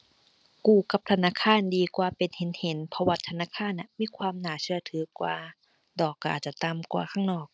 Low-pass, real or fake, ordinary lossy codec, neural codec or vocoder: none; real; none; none